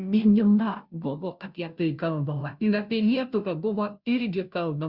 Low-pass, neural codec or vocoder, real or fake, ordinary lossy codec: 5.4 kHz; codec, 16 kHz, 0.5 kbps, FunCodec, trained on Chinese and English, 25 frames a second; fake; Opus, 64 kbps